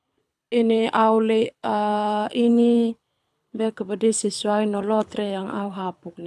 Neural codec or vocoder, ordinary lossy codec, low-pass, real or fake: codec, 24 kHz, 6 kbps, HILCodec; none; none; fake